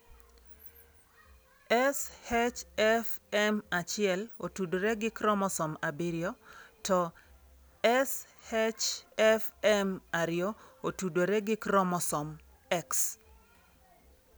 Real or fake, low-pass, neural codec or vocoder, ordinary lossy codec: real; none; none; none